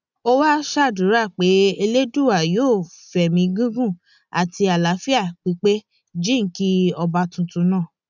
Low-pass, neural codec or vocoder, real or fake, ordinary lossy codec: 7.2 kHz; none; real; none